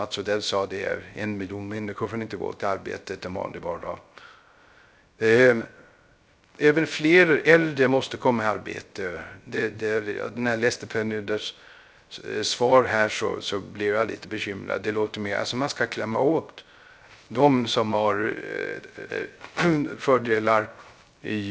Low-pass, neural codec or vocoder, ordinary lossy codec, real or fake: none; codec, 16 kHz, 0.3 kbps, FocalCodec; none; fake